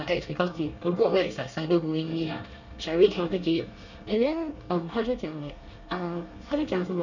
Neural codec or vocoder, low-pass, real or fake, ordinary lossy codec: codec, 24 kHz, 1 kbps, SNAC; 7.2 kHz; fake; none